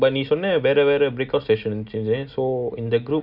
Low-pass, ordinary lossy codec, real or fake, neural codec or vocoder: 5.4 kHz; none; real; none